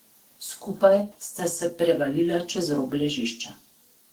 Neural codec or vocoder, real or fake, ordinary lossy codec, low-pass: codec, 44.1 kHz, 7.8 kbps, DAC; fake; Opus, 16 kbps; 19.8 kHz